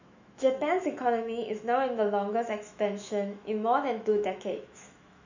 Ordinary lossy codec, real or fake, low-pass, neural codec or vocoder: none; fake; 7.2 kHz; autoencoder, 48 kHz, 128 numbers a frame, DAC-VAE, trained on Japanese speech